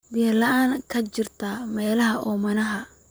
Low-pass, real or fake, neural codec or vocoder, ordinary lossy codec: none; fake; vocoder, 44.1 kHz, 128 mel bands, Pupu-Vocoder; none